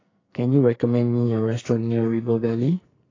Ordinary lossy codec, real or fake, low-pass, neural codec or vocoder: AAC, 32 kbps; fake; 7.2 kHz; codec, 44.1 kHz, 2.6 kbps, SNAC